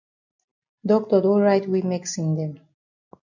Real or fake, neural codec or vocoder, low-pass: real; none; 7.2 kHz